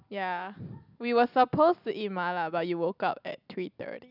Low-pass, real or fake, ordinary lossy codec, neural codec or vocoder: 5.4 kHz; real; none; none